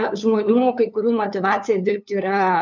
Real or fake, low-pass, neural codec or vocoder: fake; 7.2 kHz; codec, 16 kHz, 2 kbps, FunCodec, trained on LibriTTS, 25 frames a second